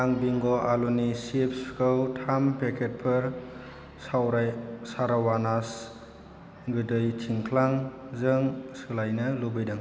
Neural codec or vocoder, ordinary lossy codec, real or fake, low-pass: none; none; real; none